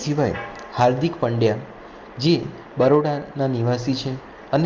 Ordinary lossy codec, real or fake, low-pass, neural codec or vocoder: Opus, 32 kbps; real; 7.2 kHz; none